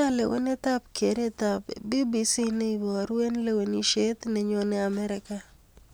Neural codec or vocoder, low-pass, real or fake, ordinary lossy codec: none; none; real; none